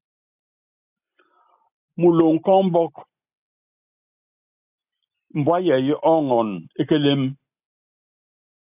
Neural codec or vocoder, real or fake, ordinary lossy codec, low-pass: none; real; AAC, 32 kbps; 3.6 kHz